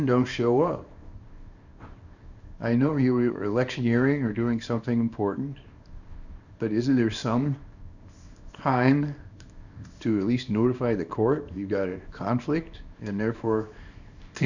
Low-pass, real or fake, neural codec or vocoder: 7.2 kHz; fake; codec, 24 kHz, 0.9 kbps, WavTokenizer, small release